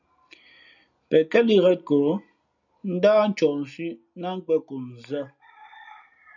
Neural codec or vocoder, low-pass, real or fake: none; 7.2 kHz; real